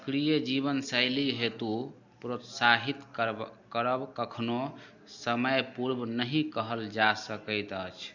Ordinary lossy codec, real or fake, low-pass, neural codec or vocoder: none; real; 7.2 kHz; none